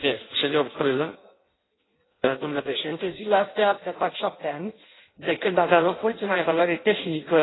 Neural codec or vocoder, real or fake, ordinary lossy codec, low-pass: codec, 16 kHz in and 24 kHz out, 0.6 kbps, FireRedTTS-2 codec; fake; AAC, 16 kbps; 7.2 kHz